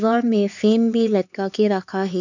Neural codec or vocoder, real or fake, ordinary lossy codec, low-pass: codec, 16 kHz, 4 kbps, X-Codec, HuBERT features, trained on LibriSpeech; fake; none; 7.2 kHz